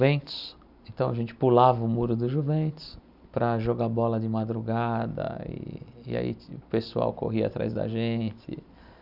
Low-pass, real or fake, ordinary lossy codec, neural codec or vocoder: 5.4 kHz; real; none; none